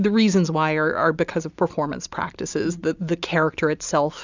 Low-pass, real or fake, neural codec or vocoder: 7.2 kHz; real; none